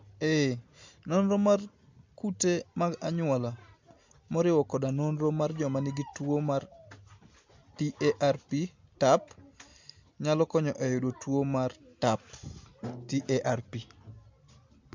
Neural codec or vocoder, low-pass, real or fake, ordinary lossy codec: none; 7.2 kHz; real; MP3, 64 kbps